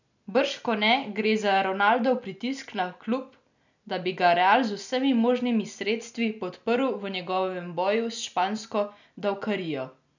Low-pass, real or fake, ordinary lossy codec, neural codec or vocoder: 7.2 kHz; real; none; none